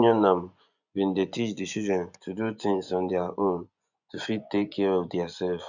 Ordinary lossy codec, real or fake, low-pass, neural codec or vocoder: none; fake; 7.2 kHz; vocoder, 24 kHz, 100 mel bands, Vocos